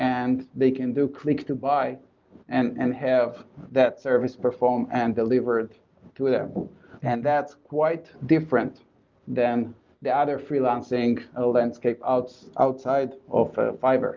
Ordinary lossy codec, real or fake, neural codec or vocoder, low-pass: Opus, 24 kbps; real; none; 7.2 kHz